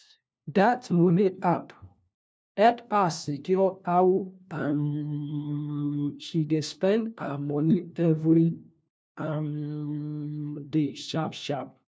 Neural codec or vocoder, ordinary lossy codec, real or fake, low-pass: codec, 16 kHz, 1 kbps, FunCodec, trained on LibriTTS, 50 frames a second; none; fake; none